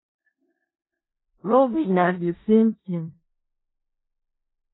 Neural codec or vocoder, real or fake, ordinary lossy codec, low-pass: codec, 16 kHz in and 24 kHz out, 0.4 kbps, LongCat-Audio-Codec, four codebook decoder; fake; AAC, 16 kbps; 7.2 kHz